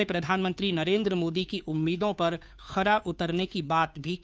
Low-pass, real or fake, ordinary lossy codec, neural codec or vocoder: none; fake; none; codec, 16 kHz, 2 kbps, FunCodec, trained on Chinese and English, 25 frames a second